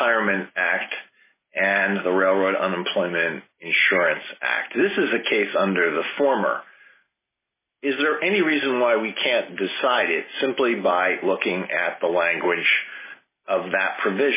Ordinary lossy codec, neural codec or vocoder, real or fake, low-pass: MP3, 16 kbps; none; real; 3.6 kHz